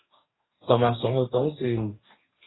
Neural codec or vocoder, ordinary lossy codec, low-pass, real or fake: codec, 44.1 kHz, 2.6 kbps, DAC; AAC, 16 kbps; 7.2 kHz; fake